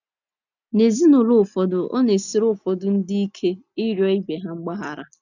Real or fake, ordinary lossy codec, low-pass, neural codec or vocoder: real; none; 7.2 kHz; none